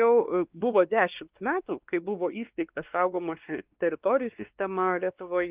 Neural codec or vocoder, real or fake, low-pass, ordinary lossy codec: codec, 16 kHz, 2 kbps, X-Codec, WavLM features, trained on Multilingual LibriSpeech; fake; 3.6 kHz; Opus, 32 kbps